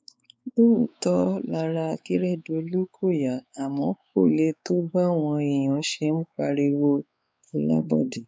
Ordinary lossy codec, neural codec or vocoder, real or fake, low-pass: none; codec, 16 kHz, 4 kbps, X-Codec, WavLM features, trained on Multilingual LibriSpeech; fake; none